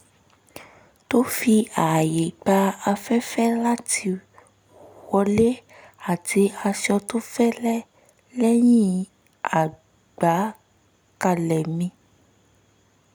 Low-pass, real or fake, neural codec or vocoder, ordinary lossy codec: none; real; none; none